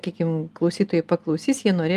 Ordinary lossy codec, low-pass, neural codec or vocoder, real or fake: Opus, 32 kbps; 14.4 kHz; none; real